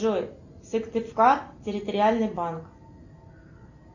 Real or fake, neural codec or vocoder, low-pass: real; none; 7.2 kHz